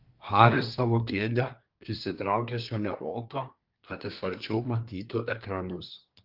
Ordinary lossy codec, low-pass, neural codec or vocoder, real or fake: Opus, 32 kbps; 5.4 kHz; codec, 24 kHz, 1 kbps, SNAC; fake